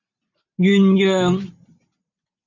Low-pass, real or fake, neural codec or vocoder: 7.2 kHz; real; none